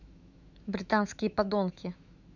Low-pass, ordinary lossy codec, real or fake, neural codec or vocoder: 7.2 kHz; none; fake; autoencoder, 48 kHz, 128 numbers a frame, DAC-VAE, trained on Japanese speech